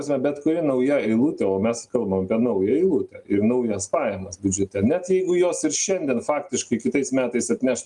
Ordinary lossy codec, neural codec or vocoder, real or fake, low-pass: Opus, 32 kbps; none; real; 10.8 kHz